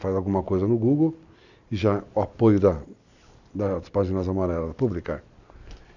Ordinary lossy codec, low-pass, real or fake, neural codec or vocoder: none; 7.2 kHz; real; none